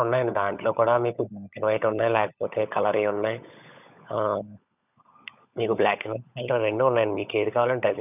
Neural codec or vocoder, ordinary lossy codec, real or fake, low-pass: codec, 16 kHz, 16 kbps, FunCodec, trained on LibriTTS, 50 frames a second; none; fake; 3.6 kHz